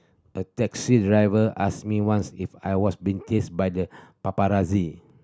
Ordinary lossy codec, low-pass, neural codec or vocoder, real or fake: none; none; none; real